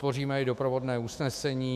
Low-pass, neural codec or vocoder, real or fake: 14.4 kHz; autoencoder, 48 kHz, 128 numbers a frame, DAC-VAE, trained on Japanese speech; fake